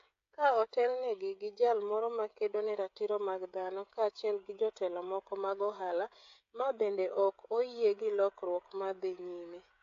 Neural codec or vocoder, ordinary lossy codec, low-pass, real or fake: codec, 16 kHz, 16 kbps, FreqCodec, smaller model; AAC, 48 kbps; 7.2 kHz; fake